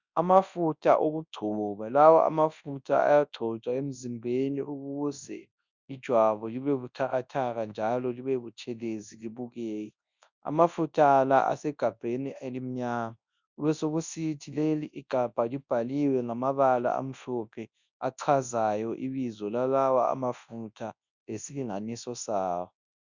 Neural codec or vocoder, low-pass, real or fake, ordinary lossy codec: codec, 24 kHz, 0.9 kbps, WavTokenizer, large speech release; 7.2 kHz; fake; Opus, 64 kbps